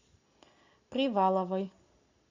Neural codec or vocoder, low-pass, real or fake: none; 7.2 kHz; real